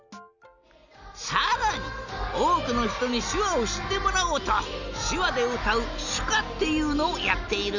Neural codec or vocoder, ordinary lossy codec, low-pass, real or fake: none; none; 7.2 kHz; real